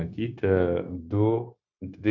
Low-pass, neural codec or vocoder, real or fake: 7.2 kHz; codec, 16 kHz, 0.9 kbps, LongCat-Audio-Codec; fake